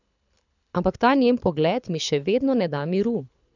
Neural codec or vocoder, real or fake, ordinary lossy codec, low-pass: codec, 24 kHz, 6 kbps, HILCodec; fake; none; 7.2 kHz